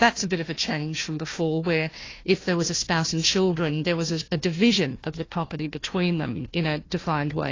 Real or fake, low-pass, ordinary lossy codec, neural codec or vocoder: fake; 7.2 kHz; AAC, 32 kbps; codec, 16 kHz, 1 kbps, FunCodec, trained on Chinese and English, 50 frames a second